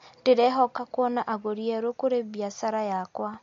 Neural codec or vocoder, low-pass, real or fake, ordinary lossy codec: none; 7.2 kHz; real; MP3, 48 kbps